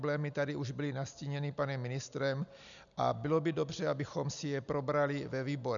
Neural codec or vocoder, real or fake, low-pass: none; real; 7.2 kHz